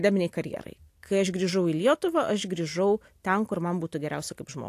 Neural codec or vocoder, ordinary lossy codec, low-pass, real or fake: none; AAC, 64 kbps; 14.4 kHz; real